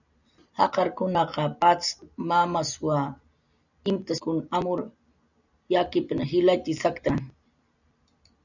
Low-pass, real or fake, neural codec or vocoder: 7.2 kHz; real; none